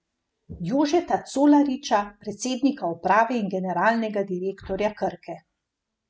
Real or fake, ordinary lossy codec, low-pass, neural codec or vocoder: real; none; none; none